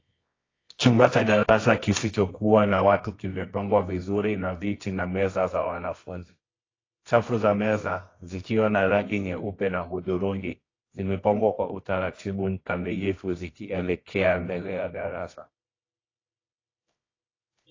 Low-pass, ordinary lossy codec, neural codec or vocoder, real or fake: 7.2 kHz; AAC, 32 kbps; codec, 24 kHz, 0.9 kbps, WavTokenizer, medium music audio release; fake